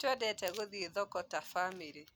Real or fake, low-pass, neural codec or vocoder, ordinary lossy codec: real; none; none; none